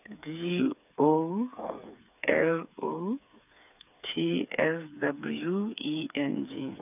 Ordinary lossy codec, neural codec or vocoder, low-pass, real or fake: none; codec, 16 kHz, 4 kbps, FreqCodec, smaller model; 3.6 kHz; fake